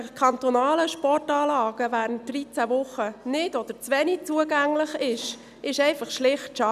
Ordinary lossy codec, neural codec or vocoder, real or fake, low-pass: none; none; real; 14.4 kHz